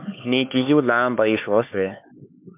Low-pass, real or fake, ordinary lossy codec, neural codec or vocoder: 3.6 kHz; fake; MP3, 32 kbps; codec, 16 kHz, 4 kbps, X-Codec, HuBERT features, trained on LibriSpeech